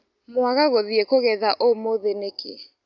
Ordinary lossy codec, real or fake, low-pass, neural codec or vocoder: none; real; 7.2 kHz; none